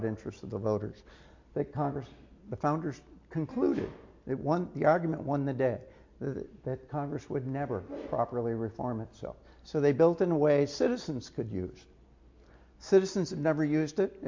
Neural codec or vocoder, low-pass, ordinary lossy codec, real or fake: none; 7.2 kHz; MP3, 48 kbps; real